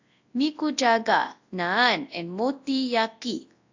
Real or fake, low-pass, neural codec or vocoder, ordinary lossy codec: fake; 7.2 kHz; codec, 24 kHz, 0.9 kbps, WavTokenizer, large speech release; AAC, 48 kbps